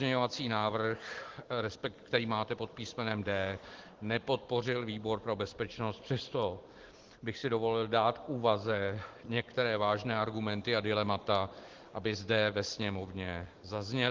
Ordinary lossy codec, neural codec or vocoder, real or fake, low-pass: Opus, 16 kbps; none; real; 7.2 kHz